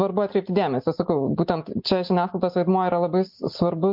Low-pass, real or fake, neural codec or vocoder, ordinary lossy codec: 5.4 kHz; real; none; MP3, 48 kbps